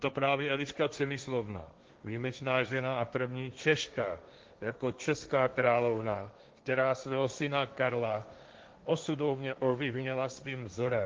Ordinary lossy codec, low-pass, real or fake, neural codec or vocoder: Opus, 24 kbps; 7.2 kHz; fake; codec, 16 kHz, 1.1 kbps, Voila-Tokenizer